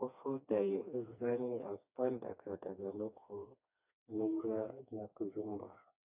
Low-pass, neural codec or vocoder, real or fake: 3.6 kHz; codec, 16 kHz, 2 kbps, FreqCodec, smaller model; fake